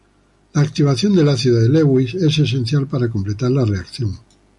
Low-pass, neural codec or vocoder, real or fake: 10.8 kHz; none; real